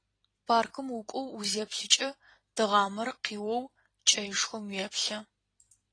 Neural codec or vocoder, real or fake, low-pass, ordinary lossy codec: vocoder, 44.1 kHz, 128 mel bands every 256 samples, BigVGAN v2; fake; 9.9 kHz; AAC, 32 kbps